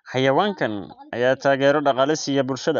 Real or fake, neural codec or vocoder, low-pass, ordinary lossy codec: real; none; 7.2 kHz; none